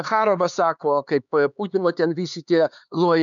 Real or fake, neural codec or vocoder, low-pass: fake; codec, 16 kHz, 4 kbps, X-Codec, HuBERT features, trained on LibriSpeech; 7.2 kHz